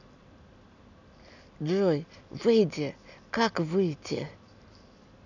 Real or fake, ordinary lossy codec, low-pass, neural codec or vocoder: real; none; 7.2 kHz; none